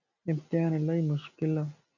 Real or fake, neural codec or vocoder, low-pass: real; none; 7.2 kHz